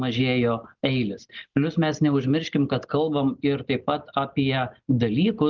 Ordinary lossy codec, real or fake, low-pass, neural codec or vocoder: Opus, 32 kbps; real; 7.2 kHz; none